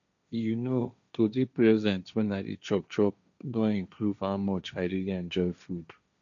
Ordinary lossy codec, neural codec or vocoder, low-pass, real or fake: none; codec, 16 kHz, 1.1 kbps, Voila-Tokenizer; 7.2 kHz; fake